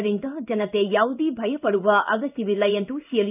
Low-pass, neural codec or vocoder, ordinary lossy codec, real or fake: 3.6 kHz; codec, 16 kHz in and 24 kHz out, 1 kbps, XY-Tokenizer; none; fake